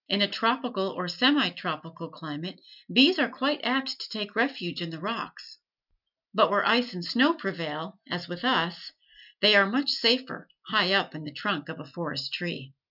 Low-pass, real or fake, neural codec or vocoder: 5.4 kHz; real; none